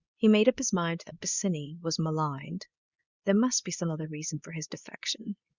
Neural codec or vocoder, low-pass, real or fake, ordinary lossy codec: codec, 24 kHz, 0.9 kbps, WavTokenizer, medium speech release version 2; 7.2 kHz; fake; Opus, 64 kbps